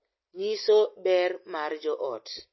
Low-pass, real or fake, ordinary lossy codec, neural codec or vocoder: 7.2 kHz; real; MP3, 24 kbps; none